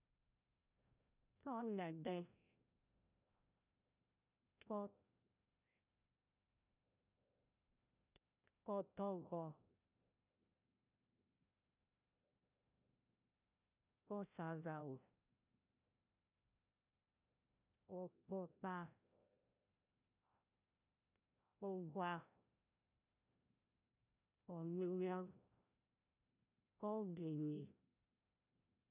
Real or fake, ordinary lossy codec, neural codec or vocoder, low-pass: fake; none; codec, 16 kHz, 0.5 kbps, FreqCodec, larger model; 3.6 kHz